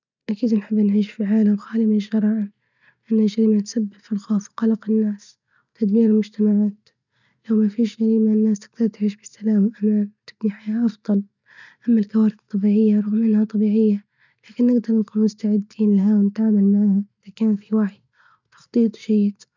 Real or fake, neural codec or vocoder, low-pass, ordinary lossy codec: real; none; 7.2 kHz; none